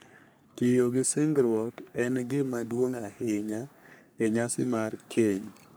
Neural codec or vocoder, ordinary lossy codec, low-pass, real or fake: codec, 44.1 kHz, 3.4 kbps, Pupu-Codec; none; none; fake